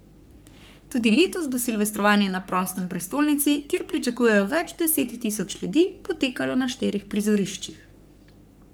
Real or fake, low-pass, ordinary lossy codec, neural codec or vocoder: fake; none; none; codec, 44.1 kHz, 3.4 kbps, Pupu-Codec